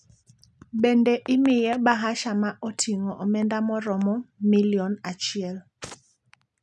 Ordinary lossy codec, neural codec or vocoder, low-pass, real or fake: none; none; none; real